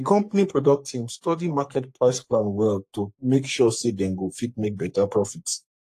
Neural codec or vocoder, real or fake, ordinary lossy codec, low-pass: codec, 44.1 kHz, 3.4 kbps, Pupu-Codec; fake; AAC, 64 kbps; 14.4 kHz